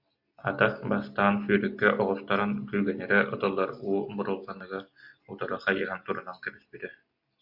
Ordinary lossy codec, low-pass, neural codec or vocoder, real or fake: AAC, 48 kbps; 5.4 kHz; none; real